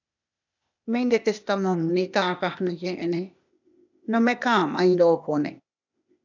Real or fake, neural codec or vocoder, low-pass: fake; codec, 16 kHz, 0.8 kbps, ZipCodec; 7.2 kHz